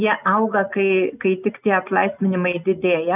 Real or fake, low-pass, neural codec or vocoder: real; 3.6 kHz; none